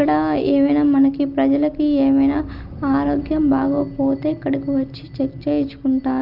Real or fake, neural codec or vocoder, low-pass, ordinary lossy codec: real; none; 5.4 kHz; Opus, 24 kbps